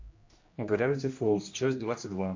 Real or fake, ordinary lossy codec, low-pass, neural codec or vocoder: fake; MP3, 48 kbps; 7.2 kHz; codec, 16 kHz, 1 kbps, X-Codec, HuBERT features, trained on general audio